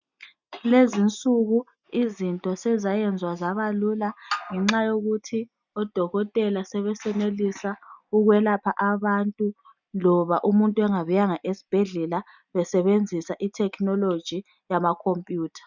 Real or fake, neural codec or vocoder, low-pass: real; none; 7.2 kHz